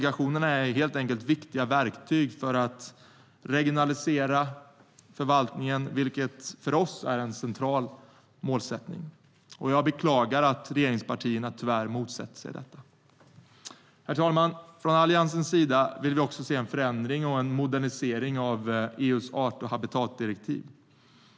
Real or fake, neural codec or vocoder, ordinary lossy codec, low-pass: real; none; none; none